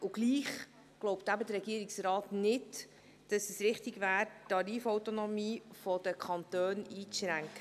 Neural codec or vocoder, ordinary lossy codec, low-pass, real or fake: none; none; 14.4 kHz; real